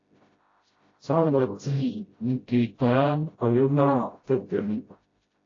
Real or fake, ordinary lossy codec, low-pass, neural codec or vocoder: fake; AAC, 32 kbps; 7.2 kHz; codec, 16 kHz, 0.5 kbps, FreqCodec, smaller model